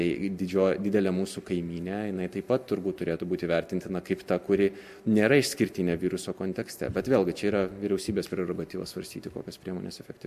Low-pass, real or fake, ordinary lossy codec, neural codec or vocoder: 14.4 kHz; real; MP3, 64 kbps; none